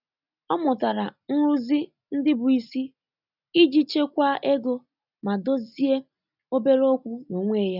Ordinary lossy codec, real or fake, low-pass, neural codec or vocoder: none; real; 5.4 kHz; none